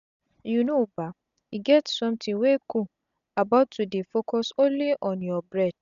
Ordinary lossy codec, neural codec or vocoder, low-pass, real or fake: none; none; 7.2 kHz; real